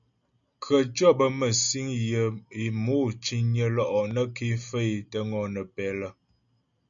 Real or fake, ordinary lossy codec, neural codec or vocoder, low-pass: real; MP3, 96 kbps; none; 7.2 kHz